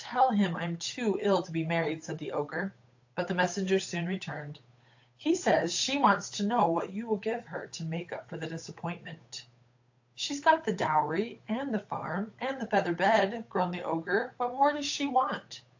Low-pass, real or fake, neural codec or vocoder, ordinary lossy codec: 7.2 kHz; fake; codec, 16 kHz, 8 kbps, FunCodec, trained on Chinese and English, 25 frames a second; AAC, 48 kbps